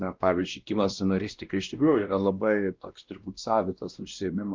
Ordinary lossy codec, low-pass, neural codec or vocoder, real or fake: Opus, 24 kbps; 7.2 kHz; codec, 16 kHz, 1 kbps, X-Codec, WavLM features, trained on Multilingual LibriSpeech; fake